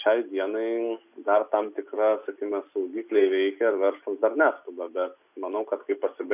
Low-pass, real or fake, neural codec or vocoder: 3.6 kHz; real; none